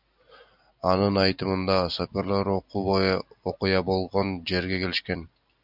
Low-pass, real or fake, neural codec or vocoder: 5.4 kHz; real; none